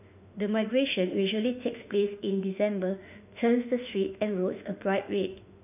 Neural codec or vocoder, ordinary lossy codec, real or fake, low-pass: autoencoder, 48 kHz, 32 numbers a frame, DAC-VAE, trained on Japanese speech; none; fake; 3.6 kHz